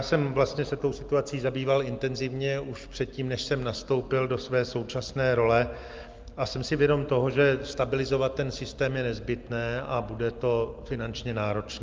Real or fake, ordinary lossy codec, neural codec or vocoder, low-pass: real; Opus, 24 kbps; none; 7.2 kHz